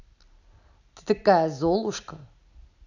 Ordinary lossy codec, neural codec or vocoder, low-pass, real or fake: none; none; 7.2 kHz; real